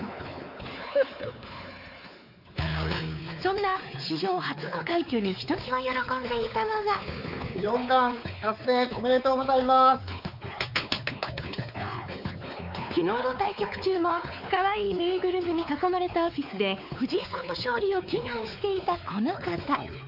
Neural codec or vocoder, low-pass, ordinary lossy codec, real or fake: codec, 16 kHz, 4 kbps, X-Codec, WavLM features, trained on Multilingual LibriSpeech; 5.4 kHz; none; fake